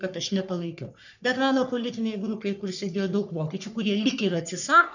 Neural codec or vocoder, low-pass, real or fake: codec, 44.1 kHz, 3.4 kbps, Pupu-Codec; 7.2 kHz; fake